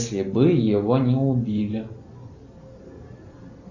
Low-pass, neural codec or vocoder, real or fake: 7.2 kHz; none; real